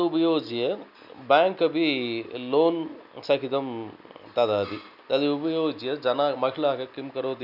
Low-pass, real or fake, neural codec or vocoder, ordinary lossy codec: 5.4 kHz; real; none; none